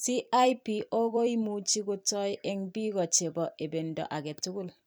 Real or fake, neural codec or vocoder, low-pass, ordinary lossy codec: fake; vocoder, 44.1 kHz, 128 mel bands every 256 samples, BigVGAN v2; none; none